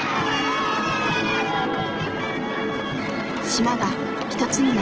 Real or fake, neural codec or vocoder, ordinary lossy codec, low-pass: real; none; Opus, 16 kbps; 7.2 kHz